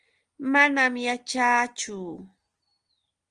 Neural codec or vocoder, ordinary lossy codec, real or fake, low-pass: none; Opus, 24 kbps; real; 9.9 kHz